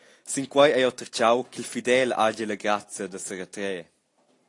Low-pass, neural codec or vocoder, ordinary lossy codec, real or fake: 10.8 kHz; none; AAC, 48 kbps; real